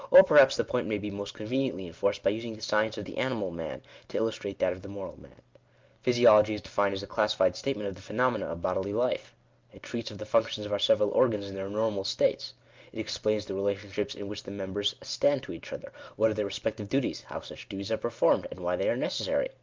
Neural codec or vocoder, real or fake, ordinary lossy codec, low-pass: none; real; Opus, 32 kbps; 7.2 kHz